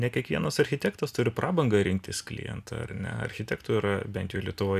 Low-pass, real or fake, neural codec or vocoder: 14.4 kHz; real; none